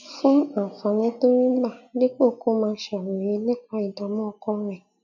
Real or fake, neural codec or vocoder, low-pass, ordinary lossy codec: real; none; 7.2 kHz; none